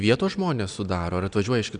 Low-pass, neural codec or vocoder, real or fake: 9.9 kHz; none; real